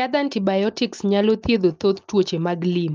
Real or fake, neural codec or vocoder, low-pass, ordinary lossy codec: real; none; 7.2 kHz; Opus, 24 kbps